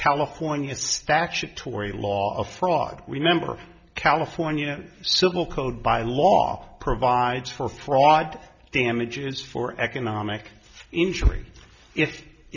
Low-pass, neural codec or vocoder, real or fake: 7.2 kHz; none; real